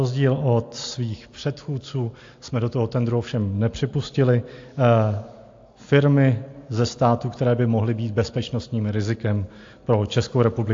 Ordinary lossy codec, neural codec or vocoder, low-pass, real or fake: AAC, 48 kbps; none; 7.2 kHz; real